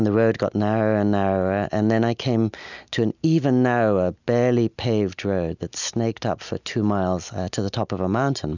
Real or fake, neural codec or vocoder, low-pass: real; none; 7.2 kHz